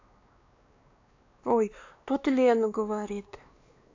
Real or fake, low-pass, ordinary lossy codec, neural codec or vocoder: fake; 7.2 kHz; AAC, 48 kbps; codec, 16 kHz, 2 kbps, X-Codec, WavLM features, trained on Multilingual LibriSpeech